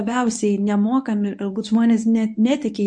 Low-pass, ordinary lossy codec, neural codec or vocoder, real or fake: 10.8 kHz; MP3, 48 kbps; codec, 24 kHz, 0.9 kbps, WavTokenizer, medium speech release version 1; fake